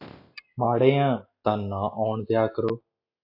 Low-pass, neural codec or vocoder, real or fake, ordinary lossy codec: 5.4 kHz; none; real; AAC, 32 kbps